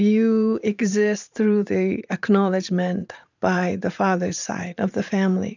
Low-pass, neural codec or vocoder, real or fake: 7.2 kHz; vocoder, 44.1 kHz, 128 mel bands every 512 samples, BigVGAN v2; fake